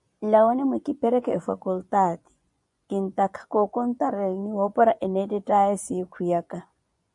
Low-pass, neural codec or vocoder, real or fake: 10.8 kHz; vocoder, 24 kHz, 100 mel bands, Vocos; fake